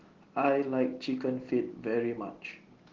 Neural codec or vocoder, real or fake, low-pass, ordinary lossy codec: none; real; 7.2 kHz; Opus, 16 kbps